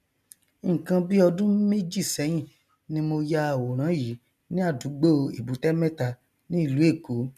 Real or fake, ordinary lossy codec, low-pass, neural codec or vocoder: real; none; 14.4 kHz; none